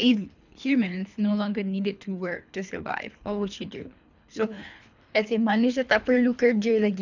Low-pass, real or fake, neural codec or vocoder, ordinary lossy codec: 7.2 kHz; fake; codec, 24 kHz, 3 kbps, HILCodec; none